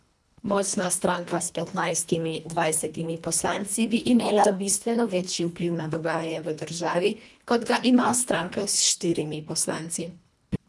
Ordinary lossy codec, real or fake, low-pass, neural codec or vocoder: none; fake; none; codec, 24 kHz, 1.5 kbps, HILCodec